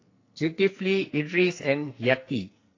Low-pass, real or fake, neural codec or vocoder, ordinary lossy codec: 7.2 kHz; fake; codec, 44.1 kHz, 2.6 kbps, SNAC; AAC, 32 kbps